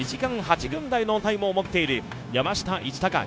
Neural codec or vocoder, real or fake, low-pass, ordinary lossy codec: codec, 16 kHz, 0.9 kbps, LongCat-Audio-Codec; fake; none; none